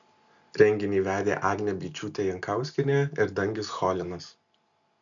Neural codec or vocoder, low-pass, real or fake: none; 7.2 kHz; real